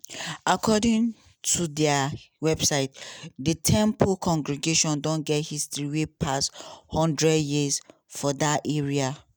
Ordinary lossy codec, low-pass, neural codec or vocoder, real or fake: none; none; none; real